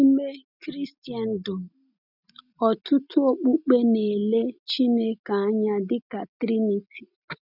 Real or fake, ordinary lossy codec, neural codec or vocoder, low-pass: real; none; none; 5.4 kHz